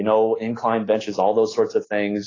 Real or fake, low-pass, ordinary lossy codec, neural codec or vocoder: real; 7.2 kHz; AAC, 32 kbps; none